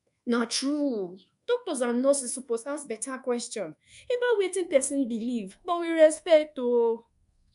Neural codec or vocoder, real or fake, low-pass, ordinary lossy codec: codec, 24 kHz, 1.2 kbps, DualCodec; fake; 10.8 kHz; none